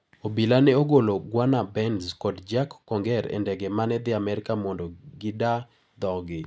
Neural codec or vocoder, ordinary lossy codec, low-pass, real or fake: none; none; none; real